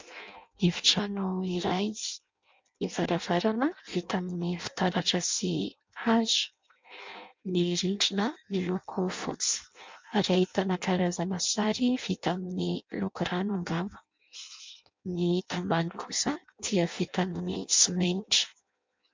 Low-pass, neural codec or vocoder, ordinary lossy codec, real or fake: 7.2 kHz; codec, 16 kHz in and 24 kHz out, 0.6 kbps, FireRedTTS-2 codec; MP3, 64 kbps; fake